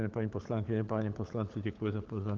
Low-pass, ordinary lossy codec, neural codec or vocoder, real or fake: 7.2 kHz; Opus, 16 kbps; codec, 16 kHz, 16 kbps, FunCodec, trained on LibriTTS, 50 frames a second; fake